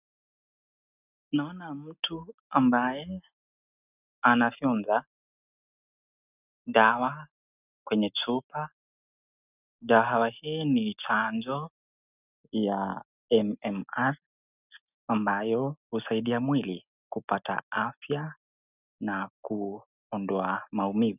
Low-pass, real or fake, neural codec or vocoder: 3.6 kHz; real; none